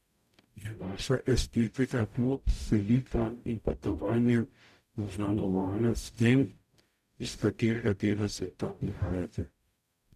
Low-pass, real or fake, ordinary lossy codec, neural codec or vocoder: 14.4 kHz; fake; none; codec, 44.1 kHz, 0.9 kbps, DAC